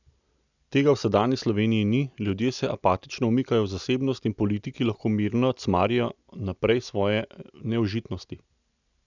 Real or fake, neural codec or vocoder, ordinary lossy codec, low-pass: real; none; none; 7.2 kHz